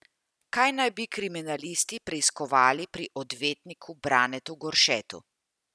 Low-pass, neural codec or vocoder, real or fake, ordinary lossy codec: none; none; real; none